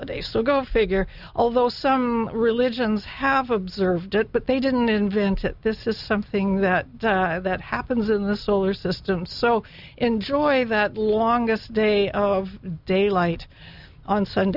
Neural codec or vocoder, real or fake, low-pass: none; real; 5.4 kHz